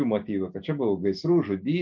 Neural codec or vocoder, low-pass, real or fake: none; 7.2 kHz; real